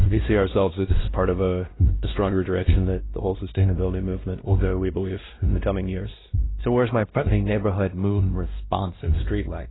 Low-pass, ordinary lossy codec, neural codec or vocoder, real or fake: 7.2 kHz; AAC, 16 kbps; codec, 16 kHz, 1 kbps, X-Codec, WavLM features, trained on Multilingual LibriSpeech; fake